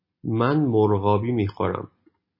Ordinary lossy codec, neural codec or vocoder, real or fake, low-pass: MP3, 24 kbps; none; real; 5.4 kHz